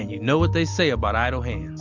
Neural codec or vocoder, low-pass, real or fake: none; 7.2 kHz; real